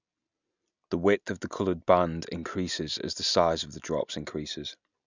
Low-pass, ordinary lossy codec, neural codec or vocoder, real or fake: 7.2 kHz; none; none; real